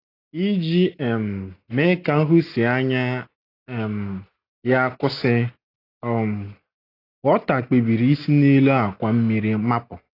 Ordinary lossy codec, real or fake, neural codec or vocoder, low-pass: AAC, 32 kbps; real; none; 5.4 kHz